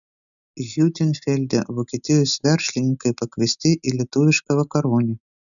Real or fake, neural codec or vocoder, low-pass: real; none; 7.2 kHz